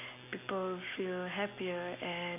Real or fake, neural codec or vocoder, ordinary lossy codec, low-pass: real; none; none; 3.6 kHz